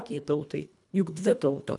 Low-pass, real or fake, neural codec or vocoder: 10.8 kHz; fake; codec, 24 kHz, 1.5 kbps, HILCodec